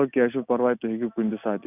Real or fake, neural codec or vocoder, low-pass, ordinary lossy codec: real; none; 3.6 kHz; none